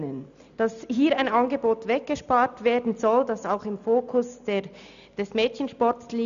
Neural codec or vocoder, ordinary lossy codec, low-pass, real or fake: none; none; 7.2 kHz; real